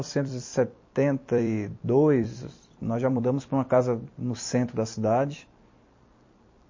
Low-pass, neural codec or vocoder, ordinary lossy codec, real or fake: 7.2 kHz; vocoder, 44.1 kHz, 128 mel bands every 256 samples, BigVGAN v2; MP3, 32 kbps; fake